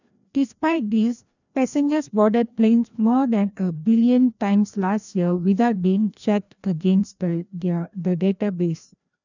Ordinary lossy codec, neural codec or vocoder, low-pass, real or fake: none; codec, 16 kHz, 1 kbps, FreqCodec, larger model; 7.2 kHz; fake